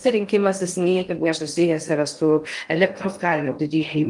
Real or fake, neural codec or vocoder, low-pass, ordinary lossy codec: fake; codec, 16 kHz in and 24 kHz out, 0.6 kbps, FocalCodec, streaming, 2048 codes; 10.8 kHz; Opus, 32 kbps